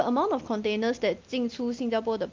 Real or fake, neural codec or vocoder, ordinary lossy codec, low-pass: real; none; Opus, 24 kbps; 7.2 kHz